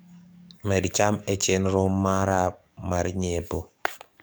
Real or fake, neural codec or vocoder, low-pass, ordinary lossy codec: fake; codec, 44.1 kHz, 7.8 kbps, DAC; none; none